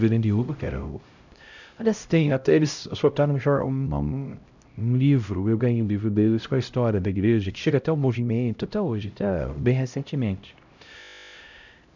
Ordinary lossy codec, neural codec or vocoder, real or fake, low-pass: none; codec, 16 kHz, 0.5 kbps, X-Codec, HuBERT features, trained on LibriSpeech; fake; 7.2 kHz